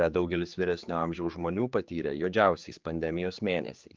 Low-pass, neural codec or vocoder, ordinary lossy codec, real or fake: 7.2 kHz; codec, 16 kHz, 4 kbps, X-Codec, HuBERT features, trained on general audio; Opus, 32 kbps; fake